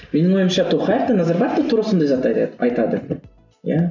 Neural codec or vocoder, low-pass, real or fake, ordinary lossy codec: none; 7.2 kHz; real; none